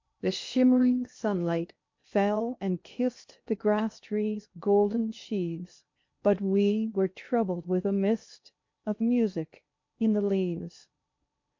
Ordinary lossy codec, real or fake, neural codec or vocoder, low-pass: MP3, 48 kbps; fake; codec, 16 kHz in and 24 kHz out, 0.8 kbps, FocalCodec, streaming, 65536 codes; 7.2 kHz